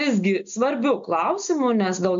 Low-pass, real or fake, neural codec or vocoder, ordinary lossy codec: 7.2 kHz; real; none; MP3, 48 kbps